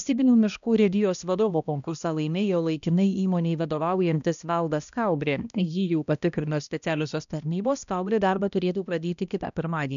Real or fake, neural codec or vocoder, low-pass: fake; codec, 16 kHz, 1 kbps, X-Codec, HuBERT features, trained on balanced general audio; 7.2 kHz